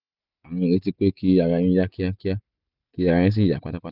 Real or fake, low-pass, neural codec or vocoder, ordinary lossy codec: real; 5.4 kHz; none; none